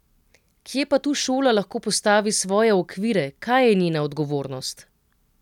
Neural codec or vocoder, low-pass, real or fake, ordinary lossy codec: none; 19.8 kHz; real; none